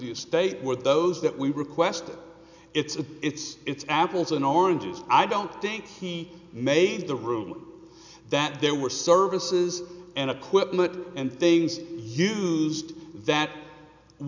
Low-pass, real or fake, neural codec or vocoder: 7.2 kHz; real; none